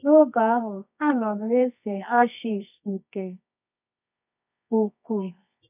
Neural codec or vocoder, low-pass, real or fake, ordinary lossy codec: codec, 24 kHz, 0.9 kbps, WavTokenizer, medium music audio release; 3.6 kHz; fake; none